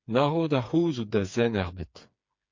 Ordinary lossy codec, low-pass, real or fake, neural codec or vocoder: MP3, 48 kbps; 7.2 kHz; fake; codec, 16 kHz, 4 kbps, FreqCodec, smaller model